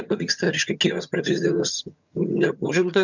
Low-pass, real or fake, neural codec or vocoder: 7.2 kHz; fake; vocoder, 22.05 kHz, 80 mel bands, HiFi-GAN